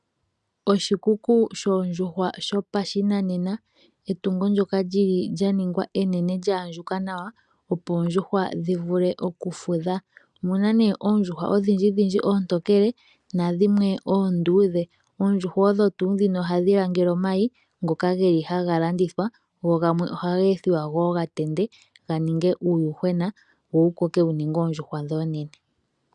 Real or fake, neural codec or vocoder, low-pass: real; none; 10.8 kHz